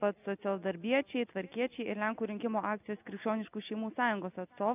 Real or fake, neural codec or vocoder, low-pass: real; none; 3.6 kHz